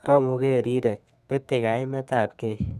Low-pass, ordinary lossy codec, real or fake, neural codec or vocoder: 14.4 kHz; none; fake; codec, 32 kHz, 1.9 kbps, SNAC